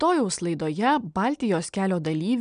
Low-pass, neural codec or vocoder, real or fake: 9.9 kHz; none; real